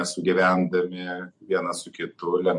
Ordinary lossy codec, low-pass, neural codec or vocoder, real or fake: MP3, 48 kbps; 10.8 kHz; none; real